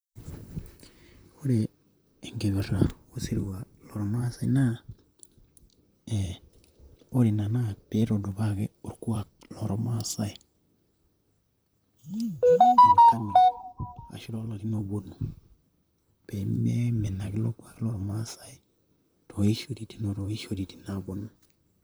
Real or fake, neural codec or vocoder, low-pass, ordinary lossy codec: fake; vocoder, 44.1 kHz, 128 mel bands, Pupu-Vocoder; none; none